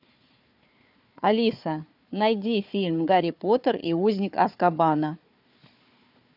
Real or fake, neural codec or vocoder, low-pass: fake; codec, 16 kHz, 4 kbps, FunCodec, trained on Chinese and English, 50 frames a second; 5.4 kHz